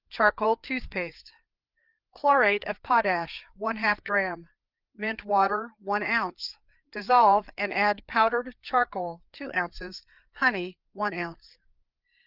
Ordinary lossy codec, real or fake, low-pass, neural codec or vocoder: Opus, 32 kbps; fake; 5.4 kHz; codec, 16 kHz, 4 kbps, FreqCodec, larger model